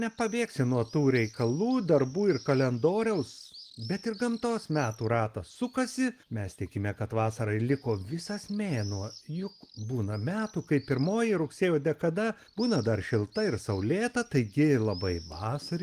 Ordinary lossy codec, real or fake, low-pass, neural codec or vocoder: Opus, 24 kbps; real; 14.4 kHz; none